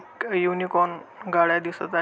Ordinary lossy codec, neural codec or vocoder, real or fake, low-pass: none; none; real; none